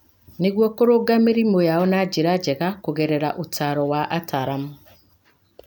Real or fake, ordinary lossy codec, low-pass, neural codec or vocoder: real; none; 19.8 kHz; none